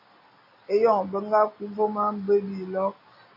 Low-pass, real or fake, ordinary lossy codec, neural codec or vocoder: 5.4 kHz; real; MP3, 24 kbps; none